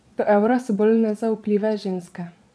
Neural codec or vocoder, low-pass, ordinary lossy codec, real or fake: vocoder, 22.05 kHz, 80 mel bands, Vocos; none; none; fake